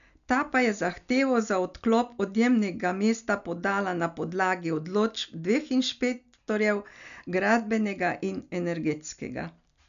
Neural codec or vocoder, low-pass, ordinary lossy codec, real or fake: none; 7.2 kHz; none; real